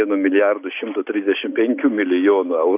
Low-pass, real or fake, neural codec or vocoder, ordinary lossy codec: 3.6 kHz; real; none; AAC, 24 kbps